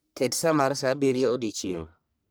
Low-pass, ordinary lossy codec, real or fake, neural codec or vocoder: none; none; fake; codec, 44.1 kHz, 1.7 kbps, Pupu-Codec